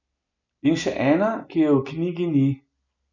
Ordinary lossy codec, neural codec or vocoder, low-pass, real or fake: none; none; 7.2 kHz; real